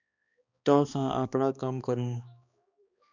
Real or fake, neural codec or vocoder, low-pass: fake; codec, 16 kHz, 2 kbps, X-Codec, HuBERT features, trained on balanced general audio; 7.2 kHz